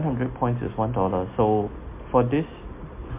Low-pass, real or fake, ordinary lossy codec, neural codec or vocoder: 3.6 kHz; real; MP3, 24 kbps; none